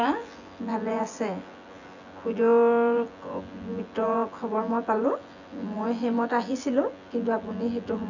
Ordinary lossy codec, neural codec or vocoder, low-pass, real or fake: none; vocoder, 24 kHz, 100 mel bands, Vocos; 7.2 kHz; fake